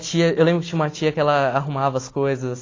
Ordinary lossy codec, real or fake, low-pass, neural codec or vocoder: AAC, 32 kbps; real; 7.2 kHz; none